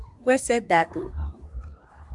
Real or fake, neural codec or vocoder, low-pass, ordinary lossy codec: fake; codec, 24 kHz, 1 kbps, SNAC; 10.8 kHz; MP3, 96 kbps